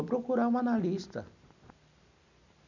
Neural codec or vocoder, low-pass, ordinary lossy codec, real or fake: none; 7.2 kHz; none; real